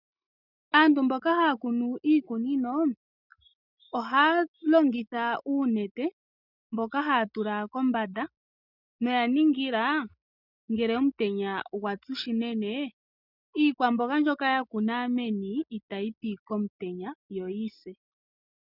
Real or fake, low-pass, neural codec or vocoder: real; 5.4 kHz; none